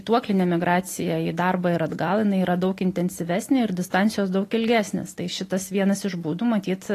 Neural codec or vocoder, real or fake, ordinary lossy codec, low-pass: none; real; AAC, 48 kbps; 14.4 kHz